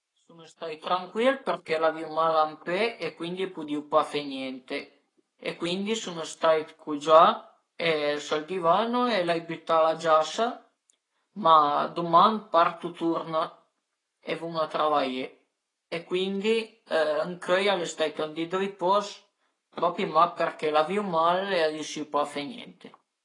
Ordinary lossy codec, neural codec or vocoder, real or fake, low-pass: AAC, 32 kbps; codec, 44.1 kHz, 7.8 kbps, Pupu-Codec; fake; 10.8 kHz